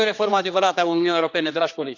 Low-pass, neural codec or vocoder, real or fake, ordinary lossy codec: 7.2 kHz; codec, 16 kHz, 2 kbps, X-Codec, HuBERT features, trained on general audio; fake; none